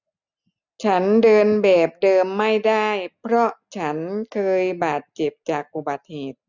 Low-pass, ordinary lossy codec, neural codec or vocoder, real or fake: 7.2 kHz; none; none; real